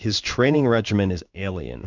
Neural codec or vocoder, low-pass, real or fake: codec, 16 kHz in and 24 kHz out, 1 kbps, XY-Tokenizer; 7.2 kHz; fake